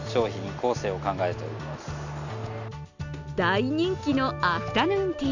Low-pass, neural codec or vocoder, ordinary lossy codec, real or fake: 7.2 kHz; none; none; real